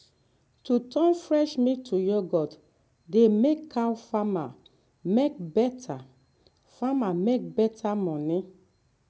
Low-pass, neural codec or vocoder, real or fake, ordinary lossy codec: none; none; real; none